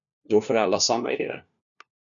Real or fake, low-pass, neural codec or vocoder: fake; 7.2 kHz; codec, 16 kHz, 1 kbps, FunCodec, trained on LibriTTS, 50 frames a second